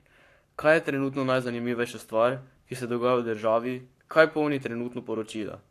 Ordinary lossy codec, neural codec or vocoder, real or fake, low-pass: AAC, 64 kbps; codec, 44.1 kHz, 7.8 kbps, Pupu-Codec; fake; 14.4 kHz